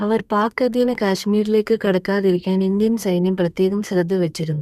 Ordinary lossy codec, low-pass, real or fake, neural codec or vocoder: none; 14.4 kHz; fake; codec, 32 kHz, 1.9 kbps, SNAC